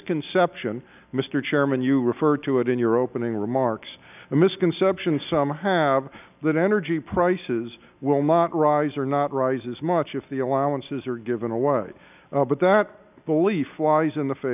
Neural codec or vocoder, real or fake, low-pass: none; real; 3.6 kHz